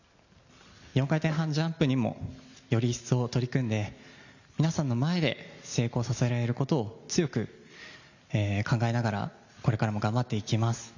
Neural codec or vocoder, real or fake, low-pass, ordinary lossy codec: none; real; 7.2 kHz; none